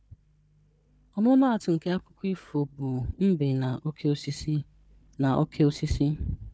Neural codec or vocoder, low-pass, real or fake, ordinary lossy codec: codec, 16 kHz, 4 kbps, FunCodec, trained on Chinese and English, 50 frames a second; none; fake; none